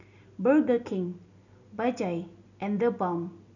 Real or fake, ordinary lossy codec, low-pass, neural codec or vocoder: real; none; 7.2 kHz; none